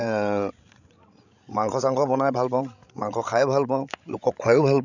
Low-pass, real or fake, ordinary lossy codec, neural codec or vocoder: 7.2 kHz; fake; none; codec, 16 kHz, 16 kbps, FreqCodec, larger model